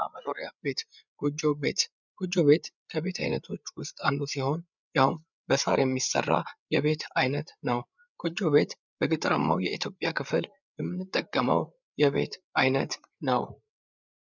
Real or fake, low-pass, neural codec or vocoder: fake; 7.2 kHz; codec, 16 kHz, 8 kbps, FreqCodec, larger model